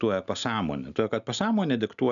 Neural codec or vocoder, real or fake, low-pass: none; real; 7.2 kHz